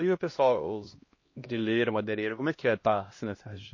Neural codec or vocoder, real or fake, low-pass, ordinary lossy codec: codec, 16 kHz, 1 kbps, X-Codec, HuBERT features, trained on LibriSpeech; fake; 7.2 kHz; MP3, 32 kbps